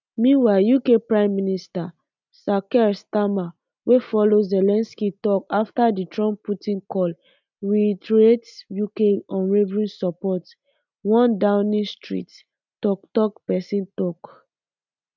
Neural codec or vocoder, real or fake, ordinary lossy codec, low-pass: none; real; none; 7.2 kHz